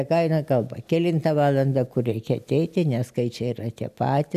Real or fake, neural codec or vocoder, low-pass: real; none; 14.4 kHz